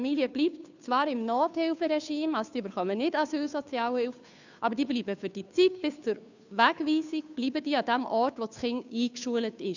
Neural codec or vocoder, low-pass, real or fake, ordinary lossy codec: codec, 16 kHz, 2 kbps, FunCodec, trained on Chinese and English, 25 frames a second; 7.2 kHz; fake; none